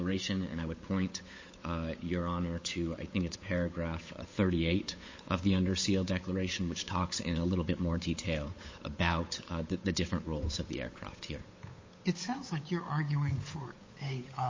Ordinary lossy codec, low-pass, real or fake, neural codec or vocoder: MP3, 32 kbps; 7.2 kHz; fake; codec, 16 kHz, 8 kbps, FunCodec, trained on Chinese and English, 25 frames a second